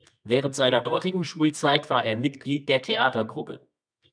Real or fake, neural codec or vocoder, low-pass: fake; codec, 24 kHz, 0.9 kbps, WavTokenizer, medium music audio release; 9.9 kHz